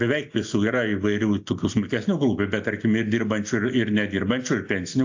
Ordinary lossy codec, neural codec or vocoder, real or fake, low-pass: AAC, 48 kbps; none; real; 7.2 kHz